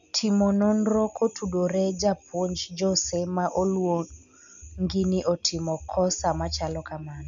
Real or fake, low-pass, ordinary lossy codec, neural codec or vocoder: real; 7.2 kHz; none; none